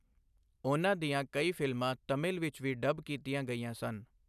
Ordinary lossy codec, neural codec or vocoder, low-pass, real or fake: none; none; 14.4 kHz; real